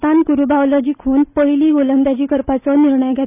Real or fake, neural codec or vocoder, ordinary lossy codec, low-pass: real; none; none; 3.6 kHz